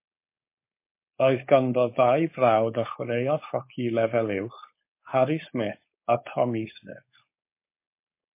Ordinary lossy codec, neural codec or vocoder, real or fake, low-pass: MP3, 24 kbps; codec, 16 kHz, 4.8 kbps, FACodec; fake; 3.6 kHz